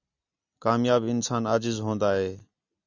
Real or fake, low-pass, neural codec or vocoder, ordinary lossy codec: real; 7.2 kHz; none; Opus, 64 kbps